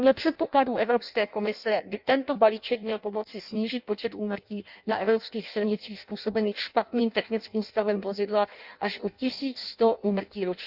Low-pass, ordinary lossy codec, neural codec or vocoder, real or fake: 5.4 kHz; Opus, 64 kbps; codec, 16 kHz in and 24 kHz out, 0.6 kbps, FireRedTTS-2 codec; fake